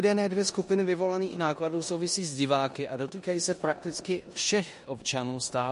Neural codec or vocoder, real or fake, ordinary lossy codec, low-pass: codec, 16 kHz in and 24 kHz out, 0.9 kbps, LongCat-Audio-Codec, four codebook decoder; fake; MP3, 48 kbps; 10.8 kHz